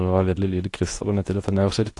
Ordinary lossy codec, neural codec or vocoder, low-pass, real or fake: AAC, 48 kbps; codec, 24 kHz, 0.9 kbps, WavTokenizer, medium speech release version 2; 10.8 kHz; fake